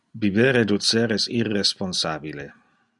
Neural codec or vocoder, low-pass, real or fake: none; 10.8 kHz; real